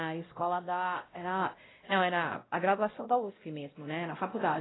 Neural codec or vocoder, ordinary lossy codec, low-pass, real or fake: codec, 16 kHz, 0.5 kbps, X-Codec, WavLM features, trained on Multilingual LibriSpeech; AAC, 16 kbps; 7.2 kHz; fake